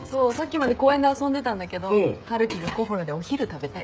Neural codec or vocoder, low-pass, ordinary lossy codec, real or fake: codec, 16 kHz, 16 kbps, FreqCodec, smaller model; none; none; fake